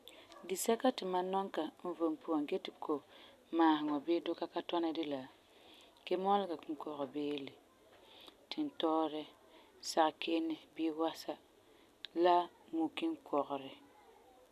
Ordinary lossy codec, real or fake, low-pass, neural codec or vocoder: none; real; 14.4 kHz; none